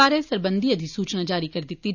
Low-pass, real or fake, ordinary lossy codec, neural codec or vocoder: 7.2 kHz; real; none; none